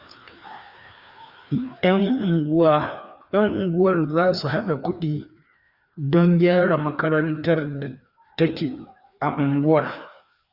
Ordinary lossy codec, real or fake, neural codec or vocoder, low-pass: Opus, 64 kbps; fake; codec, 16 kHz, 2 kbps, FreqCodec, larger model; 5.4 kHz